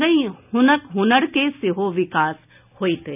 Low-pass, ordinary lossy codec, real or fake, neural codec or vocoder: 3.6 kHz; none; real; none